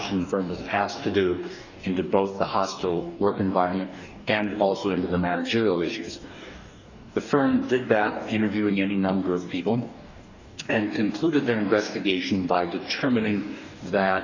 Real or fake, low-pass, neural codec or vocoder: fake; 7.2 kHz; codec, 44.1 kHz, 2.6 kbps, DAC